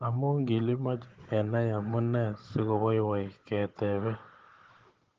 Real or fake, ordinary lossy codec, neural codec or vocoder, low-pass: fake; Opus, 16 kbps; codec, 16 kHz, 16 kbps, FunCodec, trained on Chinese and English, 50 frames a second; 7.2 kHz